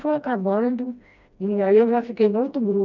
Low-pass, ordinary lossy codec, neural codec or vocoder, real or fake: 7.2 kHz; none; codec, 16 kHz, 1 kbps, FreqCodec, smaller model; fake